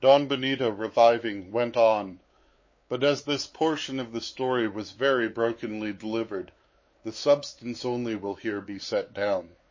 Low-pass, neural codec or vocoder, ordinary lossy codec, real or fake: 7.2 kHz; codec, 16 kHz, 4 kbps, X-Codec, WavLM features, trained on Multilingual LibriSpeech; MP3, 32 kbps; fake